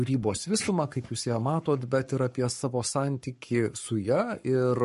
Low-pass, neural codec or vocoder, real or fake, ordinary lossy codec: 14.4 kHz; codec, 44.1 kHz, 7.8 kbps, Pupu-Codec; fake; MP3, 48 kbps